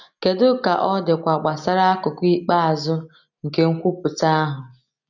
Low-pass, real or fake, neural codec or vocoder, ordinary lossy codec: 7.2 kHz; real; none; none